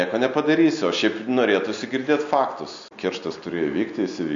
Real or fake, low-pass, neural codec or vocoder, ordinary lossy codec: real; 7.2 kHz; none; MP3, 48 kbps